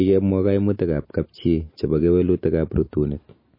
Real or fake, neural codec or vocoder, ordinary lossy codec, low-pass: real; none; MP3, 24 kbps; 5.4 kHz